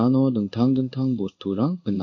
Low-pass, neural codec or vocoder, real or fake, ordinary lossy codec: 7.2 kHz; codec, 16 kHz in and 24 kHz out, 1 kbps, XY-Tokenizer; fake; MP3, 48 kbps